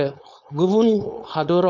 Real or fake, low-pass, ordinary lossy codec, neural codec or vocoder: fake; 7.2 kHz; none; codec, 16 kHz, 4.8 kbps, FACodec